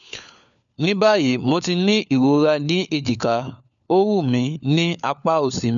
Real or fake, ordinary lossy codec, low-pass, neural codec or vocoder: fake; none; 7.2 kHz; codec, 16 kHz, 4 kbps, FunCodec, trained on LibriTTS, 50 frames a second